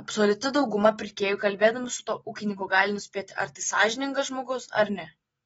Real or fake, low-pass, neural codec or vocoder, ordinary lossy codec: real; 19.8 kHz; none; AAC, 24 kbps